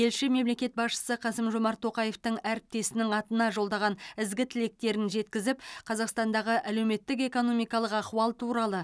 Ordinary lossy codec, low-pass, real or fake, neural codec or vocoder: none; none; real; none